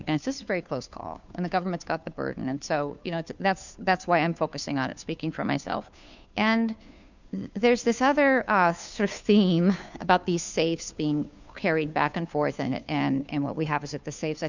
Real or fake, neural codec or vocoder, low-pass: fake; codec, 16 kHz, 2 kbps, FunCodec, trained on Chinese and English, 25 frames a second; 7.2 kHz